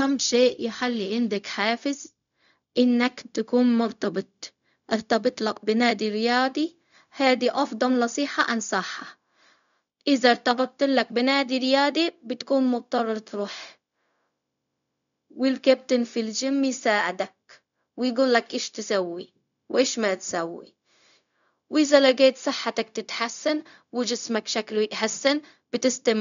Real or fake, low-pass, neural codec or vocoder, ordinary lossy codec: fake; 7.2 kHz; codec, 16 kHz, 0.4 kbps, LongCat-Audio-Codec; none